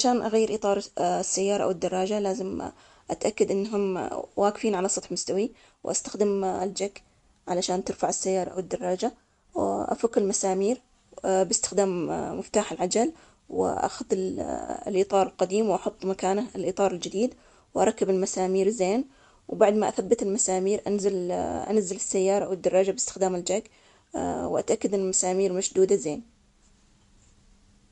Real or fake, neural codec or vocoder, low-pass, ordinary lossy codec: real; none; 10.8 kHz; MP3, 64 kbps